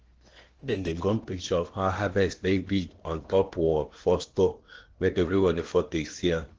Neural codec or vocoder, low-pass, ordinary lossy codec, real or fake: codec, 16 kHz in and 24 kHz out, 0.8 kbps, FocalCodec, streaming, 65536 codes; 7.2 kHz; Opus, 16 kbps; fake